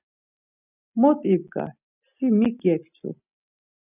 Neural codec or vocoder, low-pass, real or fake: none; 3.6 kHz; real